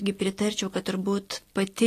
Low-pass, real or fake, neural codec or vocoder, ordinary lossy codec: 14.4 kHz; real; none; AAC, 48 kbps